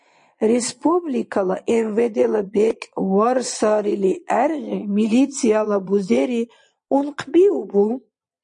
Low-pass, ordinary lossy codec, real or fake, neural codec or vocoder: 9.9 kHz; MP3, 48 kbps; fake; vocoder, 22.05 kHz, 80 mel bands, Vocos